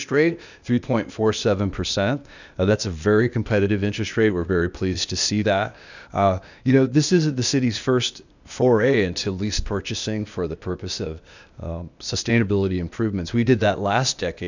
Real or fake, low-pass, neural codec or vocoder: fake; 7.2 kHz; codec, 16 kHz, 0.8 kbps, ZipCodec